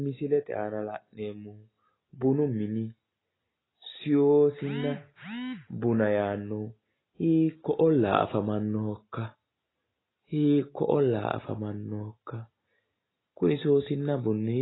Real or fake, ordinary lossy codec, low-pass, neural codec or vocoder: real; AAC, 16 kbps; 7.2 kHz; none